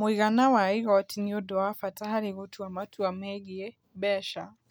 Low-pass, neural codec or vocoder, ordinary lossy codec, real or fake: none; none; none; real